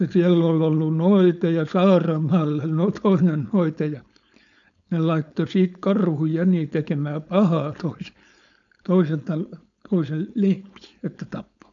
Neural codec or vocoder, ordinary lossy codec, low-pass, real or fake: codec, 16 kHz, 4.8 kbps, FACodec; none; 7.2 kHz; fake